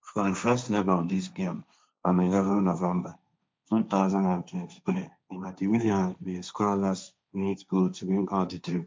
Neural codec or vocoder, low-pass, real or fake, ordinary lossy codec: codec, 16 kHz, 1.1 kbps, Voila-Tokenizer; none; fake; none